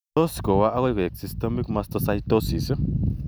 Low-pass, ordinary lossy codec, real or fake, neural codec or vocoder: none; none; real; none